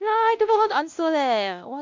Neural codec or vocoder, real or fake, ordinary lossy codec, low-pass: codec, 16 kHz, 1 kbps, X-Codec, WavLM features, trained on Multilingual LibriSpeech; fake; MP3, 64 kbps; 7.2 kHz